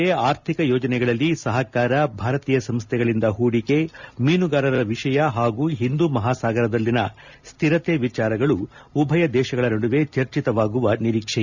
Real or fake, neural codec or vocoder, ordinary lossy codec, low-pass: real; none; none; 7.2 kHz